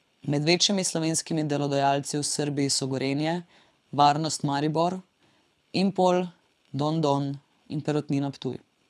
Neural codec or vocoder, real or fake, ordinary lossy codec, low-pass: codec, 24 kHz, 6 kbps, HILCodec; fake; none; none